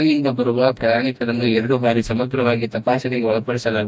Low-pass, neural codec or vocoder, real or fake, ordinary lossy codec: none; codec, 16 kHz, 1 kbps, FreqCodec, smaller model; fake; none